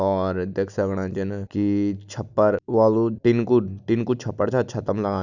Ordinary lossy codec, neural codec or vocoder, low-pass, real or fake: none; none; 7.2 kHz; real